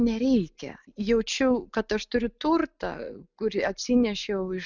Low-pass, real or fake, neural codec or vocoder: 7.2 kHz; real; none